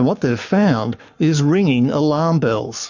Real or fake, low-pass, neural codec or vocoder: fake; 7.2 kHz; codec, 44.1 kHz, 7.8 kbps, Pupu-Codec